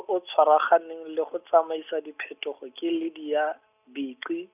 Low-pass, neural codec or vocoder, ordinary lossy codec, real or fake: 3.6 kHz; none; none; real